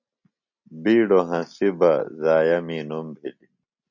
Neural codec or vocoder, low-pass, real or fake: none; 7.2 kHz; real